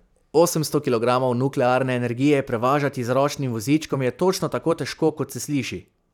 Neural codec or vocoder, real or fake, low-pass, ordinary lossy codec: vocoder, 44.1 kHz, 128 mel bands every 512 samples, BigVGAN v2; fake; 19.8 kHz; none